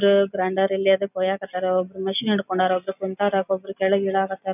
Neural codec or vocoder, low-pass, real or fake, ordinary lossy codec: none; 3.6 kHz; real; none